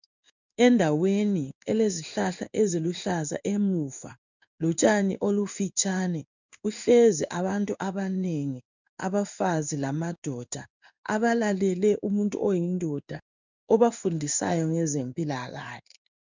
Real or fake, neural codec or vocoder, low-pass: fake; codec, 16 kHz in and 24 kHz out, 1 kbps, XY-Tokenizer; 7.2 kHz